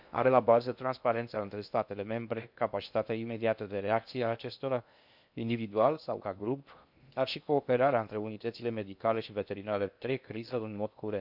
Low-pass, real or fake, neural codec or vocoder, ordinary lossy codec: 5.4 kHz; fake; codec, 16 kHz in and 24 kHz out, 0.6 kbps, FocalCodec, streaming, 2048 codes; none